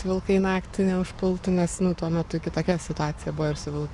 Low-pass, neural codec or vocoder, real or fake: 10.8 kHz; codec, 44.1 kHz, 7.8 kbps, Pupu-Codec; fake